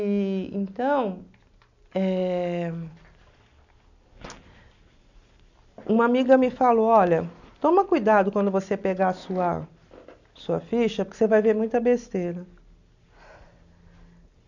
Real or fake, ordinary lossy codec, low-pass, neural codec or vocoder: real; none; 7.2 kHz; none